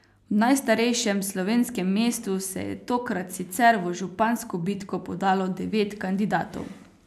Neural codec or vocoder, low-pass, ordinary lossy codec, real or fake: none; 14.4 kHz; none; real